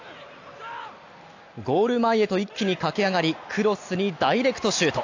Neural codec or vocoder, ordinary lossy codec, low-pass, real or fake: none; AAC, 48 kbps; 7.2 kHz; real